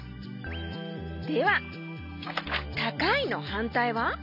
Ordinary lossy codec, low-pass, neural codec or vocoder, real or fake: none; 5.4 kHz; none; real